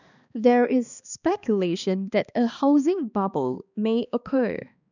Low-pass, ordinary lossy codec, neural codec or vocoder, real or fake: 7.2 kHz; none; codec, 16 kHz, 2 kbps, X-Codec, HuBERT features, trained on balanced general audio; fake